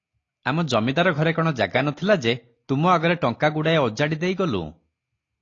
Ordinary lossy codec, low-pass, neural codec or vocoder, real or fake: AAC, 32 kbps; 7.2 kHz; none; real